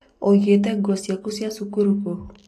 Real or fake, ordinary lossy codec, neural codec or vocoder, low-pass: real; AAC, 48 kbps; none; 14.4 kHz